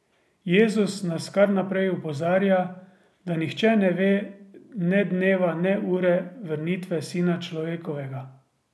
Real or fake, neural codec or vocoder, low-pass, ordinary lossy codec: real; none; none; none